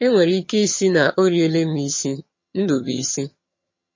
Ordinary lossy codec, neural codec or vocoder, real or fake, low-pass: MP3, 32 kbps; vocoder, 22.05 kHz, 80 mel bands, HiFi-GAN; fake; 7.2 kHz